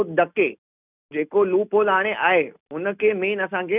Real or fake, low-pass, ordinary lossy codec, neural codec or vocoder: real; 3.6 kHz; none; none